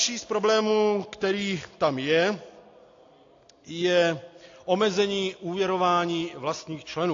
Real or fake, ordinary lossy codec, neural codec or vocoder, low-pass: real; AAC, 48 kbps; none; 7.2 kHz